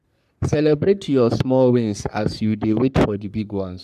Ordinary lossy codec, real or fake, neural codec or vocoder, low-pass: none; fake; codec, 44.1 kHz, 3.4 kbps, Pupu-Codec; 14.4 kHz